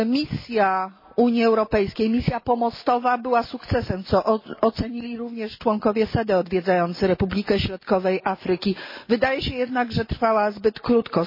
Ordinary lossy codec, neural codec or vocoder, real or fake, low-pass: MP3, 24 kbps; none; real; 5.4 kHz